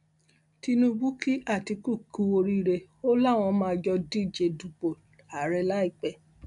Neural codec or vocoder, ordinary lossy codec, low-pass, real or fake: none; none; 10.8 kHz; real